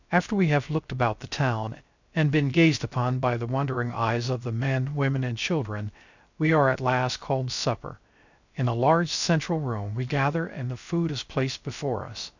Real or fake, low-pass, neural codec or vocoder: fake; 7.2 kHz; codec, 16 kHz, 0.3 kbps, FocalCodec